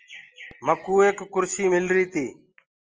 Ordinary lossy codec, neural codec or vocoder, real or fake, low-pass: Opus, 24 kbps; none; real; 7.2 kHz